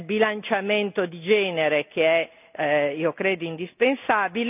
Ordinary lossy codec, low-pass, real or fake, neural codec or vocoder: none; 3.6 kHz; real; none